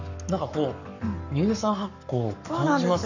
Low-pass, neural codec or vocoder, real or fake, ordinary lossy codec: 7.2 kHz; codec, 44.1 kHz, 7.8 kbps, Pupu-Codec; fake; none